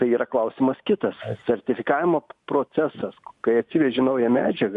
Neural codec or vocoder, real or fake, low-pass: none; real; 10.8 kHz